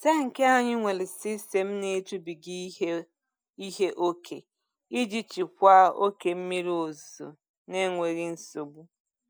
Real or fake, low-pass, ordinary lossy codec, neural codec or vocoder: real; none; none; none